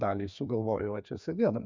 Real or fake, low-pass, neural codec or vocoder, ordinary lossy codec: fake; 7.2 kHz; codec, 16 kHz, 2 kbps, FreqCodec, larger model; MP3, 64 kbps